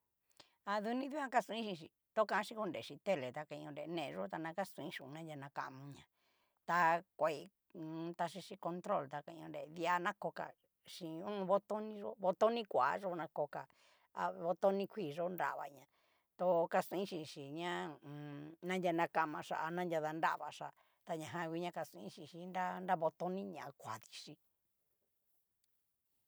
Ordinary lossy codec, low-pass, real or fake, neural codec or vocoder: none; none; real; none